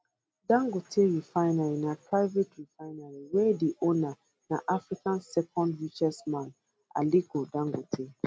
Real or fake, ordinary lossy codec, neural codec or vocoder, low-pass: real; none; none; none